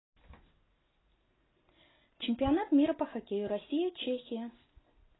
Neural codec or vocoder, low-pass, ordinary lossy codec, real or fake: none; 7.2 kHz; AAC, 16 kbps; real